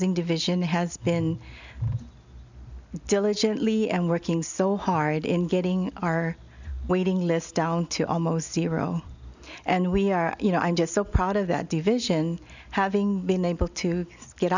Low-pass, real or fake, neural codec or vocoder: 7.2 kHz; real; none